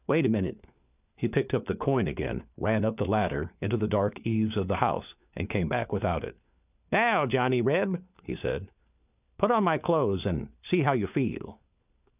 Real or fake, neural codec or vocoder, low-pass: fake; codec, 16 kHz, 4 kbps, FunCodec, trained on LibriTTS, 50 frames a second; 3.6 kHz